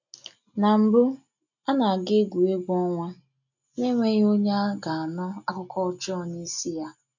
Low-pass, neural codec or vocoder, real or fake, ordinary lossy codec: 7.2 kHz; none; real; none